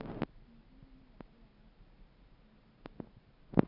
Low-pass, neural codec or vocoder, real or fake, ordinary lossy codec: 5.4 kHz; none; real; AAC, 48 kbps